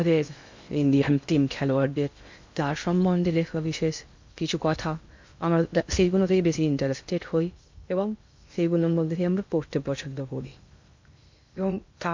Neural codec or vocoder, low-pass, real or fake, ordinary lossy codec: codec, 16 kHz in and 24 kHz out, 0.6 kbps, FocalCodec, streaming, 2048 codes; 7.2 kHz; fake; MP3, 64 kbps